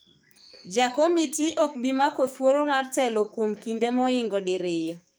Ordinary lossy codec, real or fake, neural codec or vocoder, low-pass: none; fake; codec, 44.1 kHz, 2.6 kbps, SNAC; none